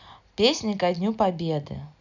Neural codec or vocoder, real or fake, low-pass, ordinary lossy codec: none; real; 7.2 kHz; none